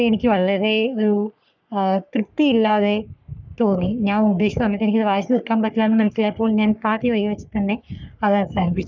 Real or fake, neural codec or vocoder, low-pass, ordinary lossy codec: fake; codec, 44.1 kHz, 3.4 kbps, Pupu-Codec; 7.2 kHz; none